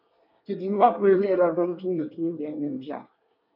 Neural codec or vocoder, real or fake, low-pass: codec, 24 kHz, 1 kbps, SNAC; fake; 5.4 kHz